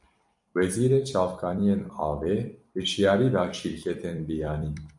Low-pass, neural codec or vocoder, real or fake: 10.8 kHz; vocoder, 44.1 kHz, 128 mel bands every 256 samples, BigVGAN v2; fake